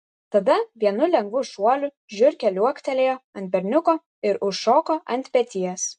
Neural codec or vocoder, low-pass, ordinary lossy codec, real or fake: none; 10.8 kHz; MP3, 64 kbps; real